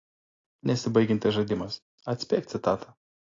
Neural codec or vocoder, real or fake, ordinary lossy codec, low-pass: none; real; AAC, 32 kbps; 7.2 kHz